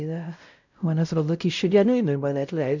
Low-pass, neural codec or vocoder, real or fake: 7.2 kHz; codec, 16 kHz, 0.5 kbps, X-Codec, WavLM features, trained on Multilingual LibriSpeech; fake